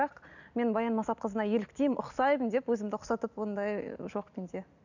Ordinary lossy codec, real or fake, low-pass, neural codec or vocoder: none; real; 7.2 kHz; none